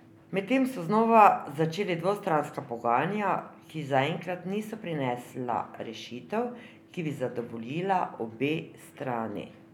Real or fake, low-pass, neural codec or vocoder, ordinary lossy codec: real; 19.8 kHz; none; none